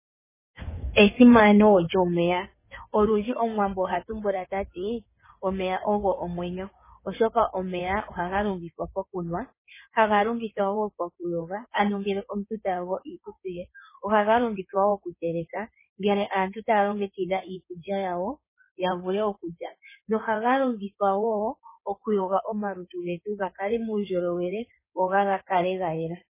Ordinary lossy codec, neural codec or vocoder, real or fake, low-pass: MP3, 16 kbps; codec, 16 kHz in and 24 kHz out, 2.2 kbps, FireRedTTS-2 codec; fake; 3.6 kHz